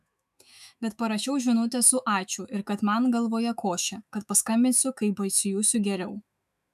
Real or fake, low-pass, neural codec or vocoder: fake; 14.4 kHz; autoencoder, 48 kHz, 128 numbers a frame, DAC-VAE, trained on Japanese speech